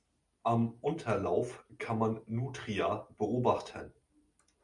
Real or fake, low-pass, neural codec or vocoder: real; 10.8 kHz; none